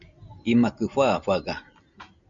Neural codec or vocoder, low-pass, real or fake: none; 7.2 kHz; real